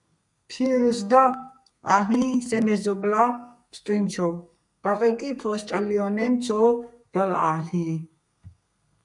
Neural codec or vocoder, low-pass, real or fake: codec, 32 kHz, 1.9 kbps, SNAC; 10.8 kHz; fake